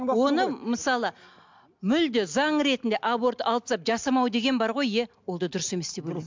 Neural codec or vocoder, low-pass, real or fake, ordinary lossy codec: none; 7.2 kHz; real; MP3, 64 kbps